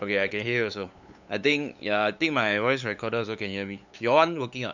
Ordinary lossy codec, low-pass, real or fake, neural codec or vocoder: none; 7.2 kHz; fake; codec, 16 kHz, 8 kbps, FunCodec, trained on LibriTTS, 25 frames a second